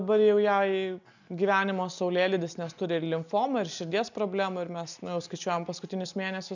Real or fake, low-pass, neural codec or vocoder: real; 7.2 kHz; none